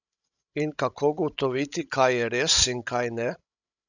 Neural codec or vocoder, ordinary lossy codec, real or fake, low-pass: codec, 16 kHz, 16 kbps, FreqCodec, larger model; none; fake; 7.2 kHz